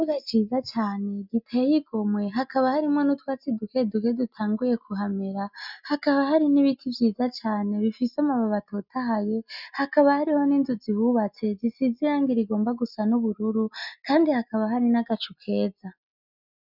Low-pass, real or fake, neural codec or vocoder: 5.4 kHz; real; none